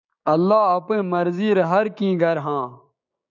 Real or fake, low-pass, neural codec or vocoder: fake; 7.2 kHz; codec, 16 kHz, 6 kbps, DAC